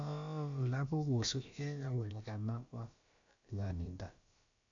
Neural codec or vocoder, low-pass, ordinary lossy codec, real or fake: codec, 16 kHz, about 1 kbps, DyCAST, with the encoder's durations; 7.2 kHz; MP3, 64 kbps; fake